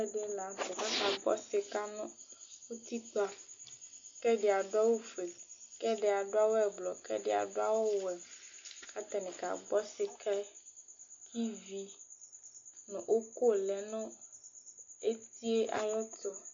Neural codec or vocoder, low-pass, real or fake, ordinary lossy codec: none; 7.2 kHz; real; AAC, 48 kbps